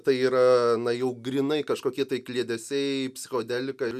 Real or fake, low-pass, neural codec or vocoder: real; 14.4 kHz; none